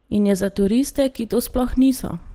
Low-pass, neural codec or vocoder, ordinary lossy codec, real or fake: 19.8 kHz; none; Opus, 16 kbps; real